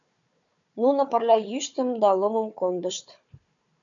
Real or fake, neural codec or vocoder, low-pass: fake; codec, 16 kHz, 4 kbps, FunCodec, trained on Chinese and English, 50 frames a second; 7.2 kHz